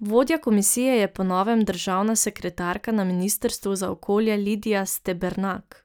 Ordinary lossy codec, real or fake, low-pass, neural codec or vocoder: none; real; none; none